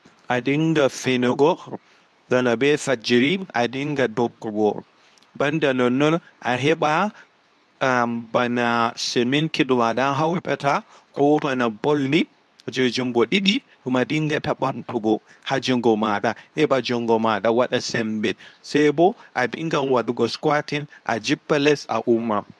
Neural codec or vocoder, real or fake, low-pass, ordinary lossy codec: codec, 24 kHz, 0.9 kbps, WavTokenizer, medium speech release version 2; fake; none; none